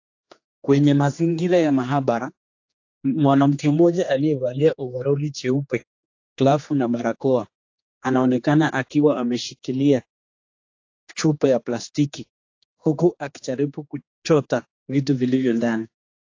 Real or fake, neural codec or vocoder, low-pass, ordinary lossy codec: fake; codec, 16 kHz, 2 kbps, X-Codec, HuBERT features, trained on general audio; 7.2 kHz; AAC, 48 kbps